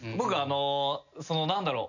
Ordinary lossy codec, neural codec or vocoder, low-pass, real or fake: none; none; 7.2 kHz; real